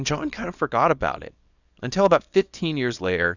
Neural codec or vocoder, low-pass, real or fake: codec, 24 kHz, 0.9 kbps, WavTokenizer, small release; 7.2 kHz; fake